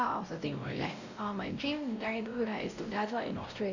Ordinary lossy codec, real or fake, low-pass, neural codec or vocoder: none; fake; 7.2 kHz; codec, 16 kHz, 0.5 kbps, X-Codec, WavLM features, trained on Multilingual LibriSpeech